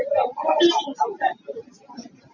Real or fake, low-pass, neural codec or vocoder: real; 7.2 kHz; none